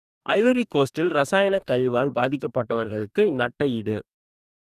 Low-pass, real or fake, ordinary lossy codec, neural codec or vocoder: 14.4 kHz; fake; none; codec, 44.1 kHz, 2.6 kbps, DAC